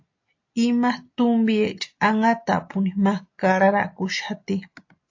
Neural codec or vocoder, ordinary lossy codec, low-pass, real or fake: none; AAC, 48 kbps; 7.2 kHz; real